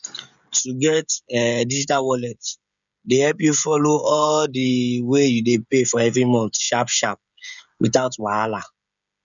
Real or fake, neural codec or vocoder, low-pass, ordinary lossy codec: fake; codec, 16 kHz, 16 kbps, FreqCodec, smaller model; 7.2 kHz; MP3, 96 kbps